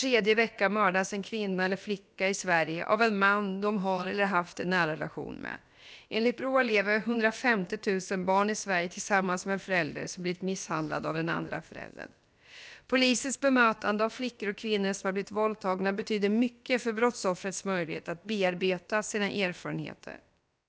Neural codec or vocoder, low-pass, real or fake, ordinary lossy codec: codec, 16 kHz, about 1 kbps, DyCAST, with the encoder's durations; none; fake; none